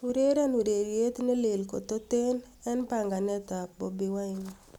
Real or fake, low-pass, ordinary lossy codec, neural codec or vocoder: real; 19.8 kHz; none; none